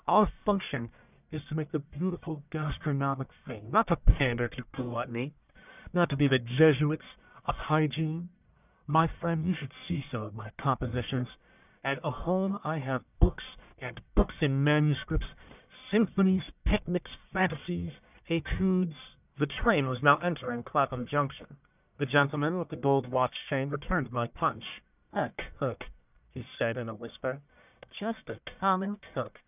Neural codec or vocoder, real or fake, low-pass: codec, 44.1 kHz, 1.7 kbps, Pupu-Codec; fake; 3.6 kHz